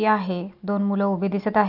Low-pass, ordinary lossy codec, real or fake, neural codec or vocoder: 5.4 kHz; AAC, 48 kbps; real; none